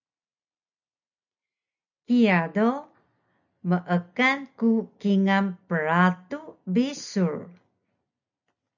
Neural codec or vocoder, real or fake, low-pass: none; real; 7.2 kHz